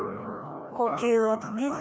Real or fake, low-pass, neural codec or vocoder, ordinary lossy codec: fake; none; codec, 16 kHz, 1 kbps, FreqCodec, larger model; none